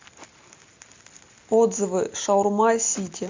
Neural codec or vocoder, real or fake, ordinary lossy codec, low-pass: none; real; MP3, 64 kbps; 7.2 kHz